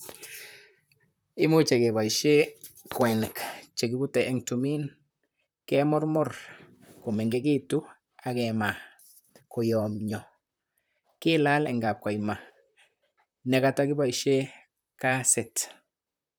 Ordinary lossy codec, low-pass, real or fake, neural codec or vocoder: none; none; fake; vocoder, 44.1 kHz, 128 mel bands, Pupu-Vocoder